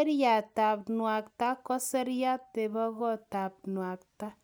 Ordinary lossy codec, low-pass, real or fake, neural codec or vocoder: none; none; real; none